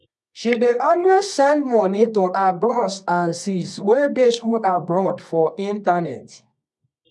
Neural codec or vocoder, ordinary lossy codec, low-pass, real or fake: codec, 24 kHz, 0.9 kbps, WavTokenizer, medium music audio release; none; none; fake